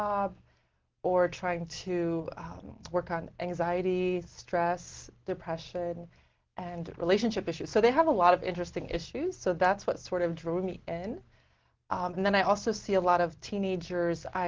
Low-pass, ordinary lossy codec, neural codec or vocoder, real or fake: 7.2 kHz; Opus, 16 kbps; none; real